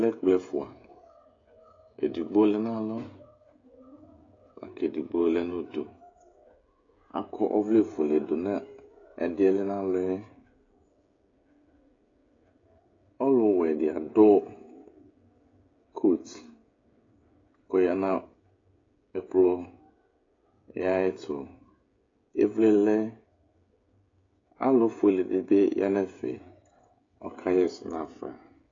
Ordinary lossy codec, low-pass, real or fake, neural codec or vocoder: AAC, 32 kbps; 7.2 kHz; fake; codec, 16 kHz, 16 kbps, FreqCodec, smaller model